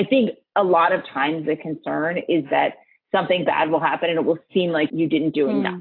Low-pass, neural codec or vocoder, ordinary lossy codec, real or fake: 5.4 kHz; none; AAC, 32 kbps; real